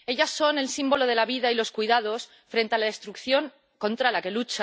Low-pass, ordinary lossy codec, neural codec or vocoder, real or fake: none; none; none; real